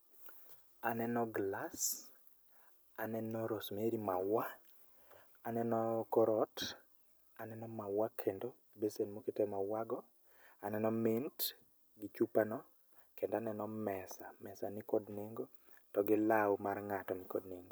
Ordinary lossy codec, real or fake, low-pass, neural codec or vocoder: none; real; none; none